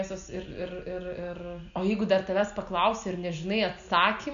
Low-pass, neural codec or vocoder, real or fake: 7.2 kHz; none; real